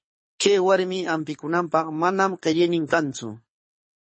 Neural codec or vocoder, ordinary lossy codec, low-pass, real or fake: codec, 24 kHz, 6 kbps, HILCodec; MP3, 32 kbps; 9.9 kHz; fake